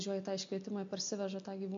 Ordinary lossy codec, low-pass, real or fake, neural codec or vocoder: MP3, 48 kbps; 7.2 kHz; real; none